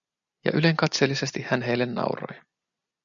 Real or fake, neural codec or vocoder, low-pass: real; none; 7.2 kHz